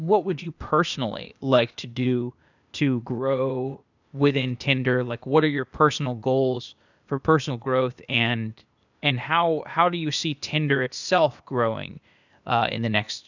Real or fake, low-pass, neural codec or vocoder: fake; 7.2 kHz; codec, 16 kHz, 0.8 kbps, ZipCodec